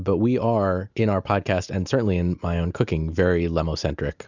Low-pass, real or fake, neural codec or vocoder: 7.2 kHz; real; none